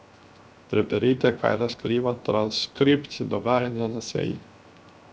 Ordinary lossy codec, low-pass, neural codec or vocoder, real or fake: none; none; codec, 16 kHz, 0.7 kbps, FocalCodec; fake